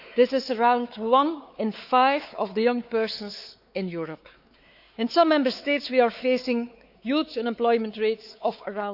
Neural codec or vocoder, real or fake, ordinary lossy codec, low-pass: codec, 16 kHz, 4 kbps, X-Codec, WavLM features, trained on Multilingual LibriSpeech; fake; none; 5.4 kHz